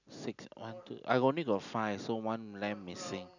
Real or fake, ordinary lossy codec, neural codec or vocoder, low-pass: real; none; none; 7.2 kHz